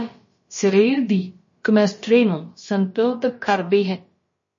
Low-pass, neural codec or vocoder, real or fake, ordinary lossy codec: 7.2 kHz; codec, 16 kHz, about 1 kbps, DyCAST, with the encoder's durations; fake; MP3, 32 kbps